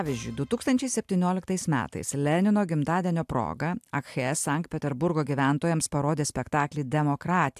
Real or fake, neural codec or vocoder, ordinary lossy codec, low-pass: real; none; AAC, 96 kbps; 14.4 kHz